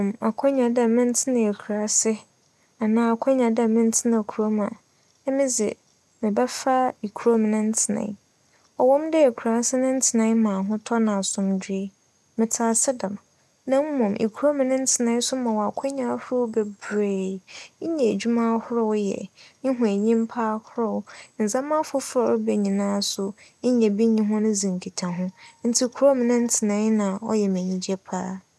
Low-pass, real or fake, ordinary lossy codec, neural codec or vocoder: none; real; none; none